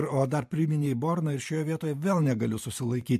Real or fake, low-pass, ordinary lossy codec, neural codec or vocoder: fake; 14.4 kHz; MP3, 64 kbps; vocoder, 44.1 kHz, 128 mel bands every 512 samples, BigVGAN v2